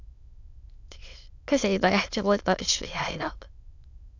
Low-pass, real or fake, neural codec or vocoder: 7.2 kHz; fake; autoencoder, 22.05 kHz, a latent of 192 numbers a frame, VITS, trained on many speakers